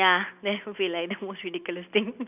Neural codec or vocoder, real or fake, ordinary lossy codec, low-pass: none; real; none; 3.6 kHz